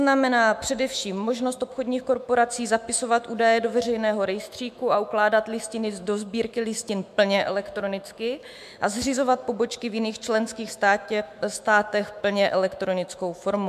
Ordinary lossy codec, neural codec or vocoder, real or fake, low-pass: MP3, 96 kbps; autoencoder, 48 kHz, 128 numbers a frame, DAC-VAE, trained on Japanese speech; fake; 14.4 kHz